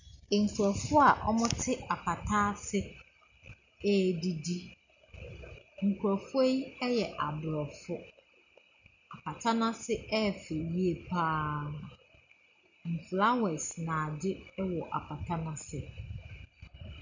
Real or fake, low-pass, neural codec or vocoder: real; 7.2 kHz; none